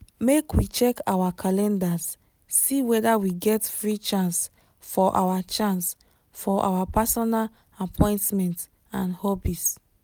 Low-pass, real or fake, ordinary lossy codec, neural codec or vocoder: none; real; none; none